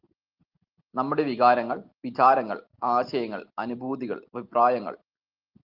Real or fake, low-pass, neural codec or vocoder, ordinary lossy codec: real; 5.4 kHz; none; Opus, 32 kbps